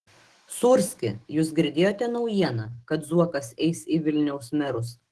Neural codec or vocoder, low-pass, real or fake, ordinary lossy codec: autoencoder, 48 kHz, 128 numbers a frame, DAC-VAE, trained on Japanese speech; 10.8 kHz; fake; Opus, 16 kbps